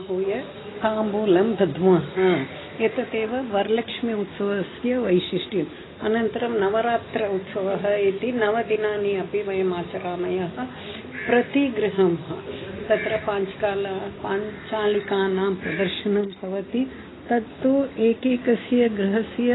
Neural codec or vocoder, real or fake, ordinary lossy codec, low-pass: none; real; AAC, 16 kbps; 7.2 kHz